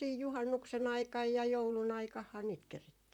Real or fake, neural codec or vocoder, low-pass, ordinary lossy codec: fake; vocoder, 44.1 kHz, 128 mel bands, Pupu-Vocoder; 19.8 kHz; none